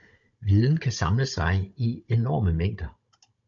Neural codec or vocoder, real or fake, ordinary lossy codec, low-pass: codec, 16 kHz, 16 kbps, FunCodec, trained on Chinese and English, 50 frames a second; fake; AAC, 64 kbps; 7.2 kHz